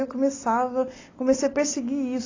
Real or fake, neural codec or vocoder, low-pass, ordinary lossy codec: real; none; 7.2 kHz; AAC, 32 kbps